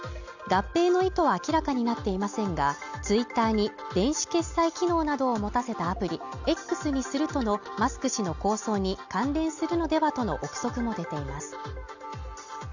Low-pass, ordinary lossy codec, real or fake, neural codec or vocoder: 7.2 kHz; none; real; none